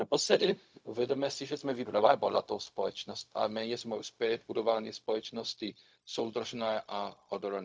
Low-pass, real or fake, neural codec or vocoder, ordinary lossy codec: none; fake; codec, 16 kHz, 0.4 kbps, LongCat-Audio-Codec; none